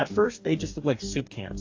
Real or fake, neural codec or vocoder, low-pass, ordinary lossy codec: fake; codec, 44.1 kHz, 2.6 kbps, DAC; 7.2 kHz; AAC, 48 kbps